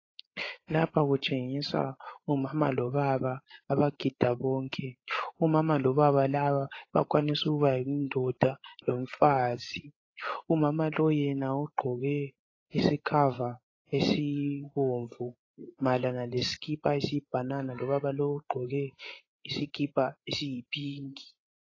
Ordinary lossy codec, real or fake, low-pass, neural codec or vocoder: AAC, 32 kbps; fake; 7.2 kHz; codec, 16 kHz, 16 kbps, FreqCodec, larger model